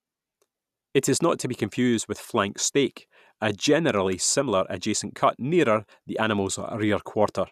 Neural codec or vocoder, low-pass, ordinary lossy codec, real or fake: none; 14.4 kHz; none; real